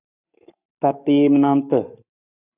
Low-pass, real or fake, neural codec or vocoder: 3.6 kHz; fake; codec, 44.1 kHz, 7.8 kbps, Pupu-Codec